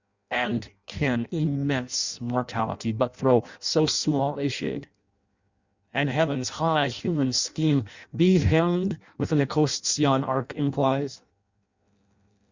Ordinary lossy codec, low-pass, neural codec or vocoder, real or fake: Opus, 64 kbps; 7.2 kHz; codec, 16 kHz in and 24 kHz out, 0.6 kbps, FireRedTTS-2 codec; fake